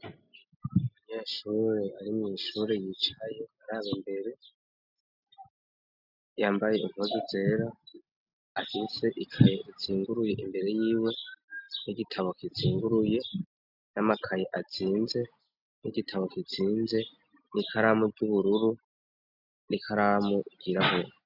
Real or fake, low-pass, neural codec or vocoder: real; 5.4 kHz; none